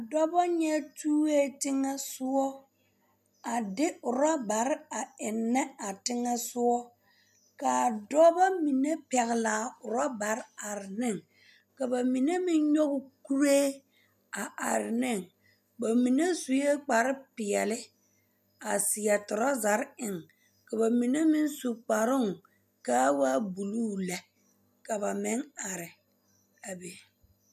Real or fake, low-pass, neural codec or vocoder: real; 14.4 kHz; none